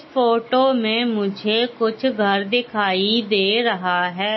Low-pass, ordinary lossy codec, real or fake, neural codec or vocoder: 7.2 kHz; MP3, 24 kbps; real; none